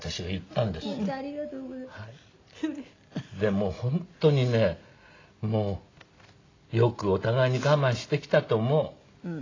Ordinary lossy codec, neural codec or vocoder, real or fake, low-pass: AAC, 32 kbps; none; real; 7.2 kHz